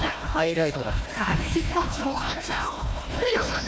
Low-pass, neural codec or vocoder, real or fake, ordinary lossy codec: none; codec, 16 kHz, 1 kbps, FunCodec, trained on Chinese and English, 50 frames a second; fake; none